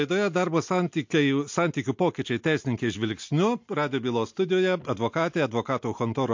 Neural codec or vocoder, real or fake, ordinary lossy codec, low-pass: none; real; MP3, 48 kbps; 7.2 kHz